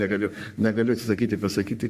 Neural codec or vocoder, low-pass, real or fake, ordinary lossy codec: codec, 44.1 kHz, 3.4 kbps, Pupu-Codec; 14.4 kHz; fake; Opus, 64 kbps